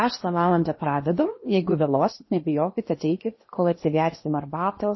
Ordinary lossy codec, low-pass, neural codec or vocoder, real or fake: MP3, 24 kbps; 7.2 kHz; codec, 16 kHz in and 24 kHz out, 0.8 kbps, FocalCodec, streaming, 65536 codes; fake